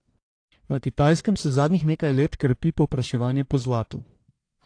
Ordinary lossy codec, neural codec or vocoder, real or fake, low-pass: AAC, 48 kbps; codec, 44.1 kHz, 1.7 kbps, Pupu-Codec; fake; 9.9 kHz